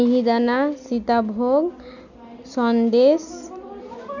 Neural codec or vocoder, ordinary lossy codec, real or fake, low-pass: none; none; real; 7.2 kHz